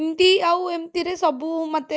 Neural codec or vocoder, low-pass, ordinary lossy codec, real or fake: none; none; none; real